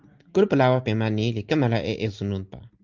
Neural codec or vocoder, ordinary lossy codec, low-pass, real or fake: none; Opus, 24 kbps; 7.2 kHz; real